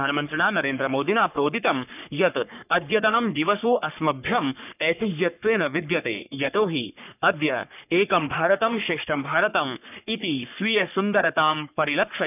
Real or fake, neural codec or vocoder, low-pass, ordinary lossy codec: fake; codec, 44.1 kHz, 3.4 kbps, Pupu-Codec; 3.6 kHz; none